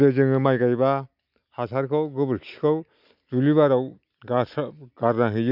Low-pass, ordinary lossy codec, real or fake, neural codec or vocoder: 5.4 kHz; none; real; none